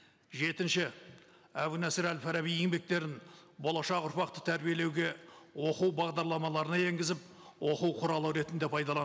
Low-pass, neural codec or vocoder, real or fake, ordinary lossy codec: none; none; real; none